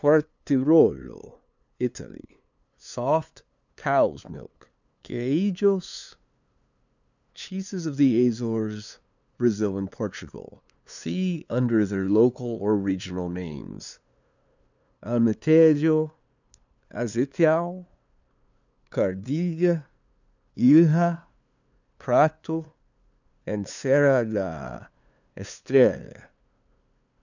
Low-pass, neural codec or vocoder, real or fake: 7.2 kHz; codec, 16 kHz, 2 kbps, FunCodec, trained on LibriTTS, 25 frames a second; fake